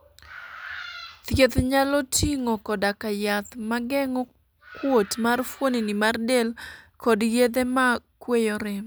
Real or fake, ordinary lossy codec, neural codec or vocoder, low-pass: real; none; none; none